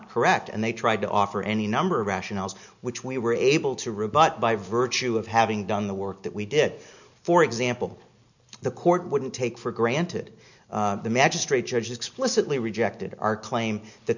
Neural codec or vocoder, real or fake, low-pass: none; real; 7.2 kHz